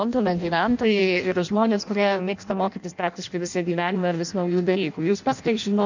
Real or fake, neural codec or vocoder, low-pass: fake; codec, 16 kHz in and 24 kHz out, 0.6 kbps, FireRedTTS-2 codec; 7.2 kHz